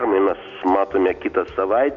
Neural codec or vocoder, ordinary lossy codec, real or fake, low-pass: none; AAC, 64 kbps; real; 7.2 kHz